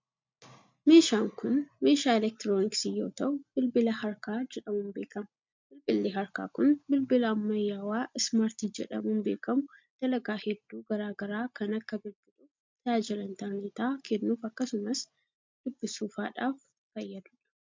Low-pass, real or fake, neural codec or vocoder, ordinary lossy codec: 7.2 kHz; real; none; MP3, 64 kbps